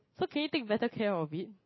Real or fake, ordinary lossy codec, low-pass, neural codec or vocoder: real; MP3, 24 kbps; 7.2 kHz; none